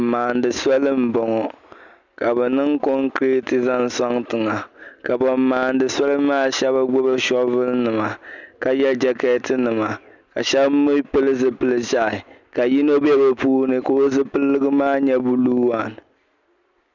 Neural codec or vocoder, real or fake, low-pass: none; real; 7.2 kHz